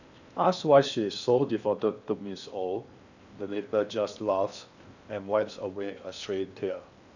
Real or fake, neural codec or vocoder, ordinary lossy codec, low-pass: fake; codec, 16 kHz in and 24 kHz out, 0.8 kbps, FocalCodec, streaming, 65536 codes; none; 7.2 kHz